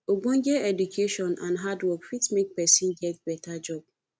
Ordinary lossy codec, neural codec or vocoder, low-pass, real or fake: none; none; none; real